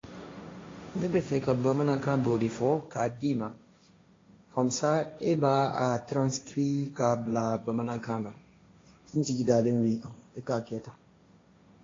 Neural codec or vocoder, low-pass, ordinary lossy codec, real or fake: codec, 16 kHz, 1.1 kbps, Voila-Tokenizer; 7.2 kHz; AAC, 32 kbps; fake